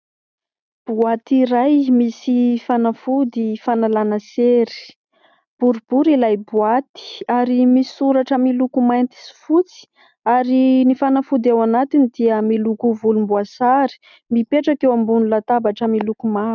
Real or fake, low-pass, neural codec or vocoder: real; 7.2 kHz; none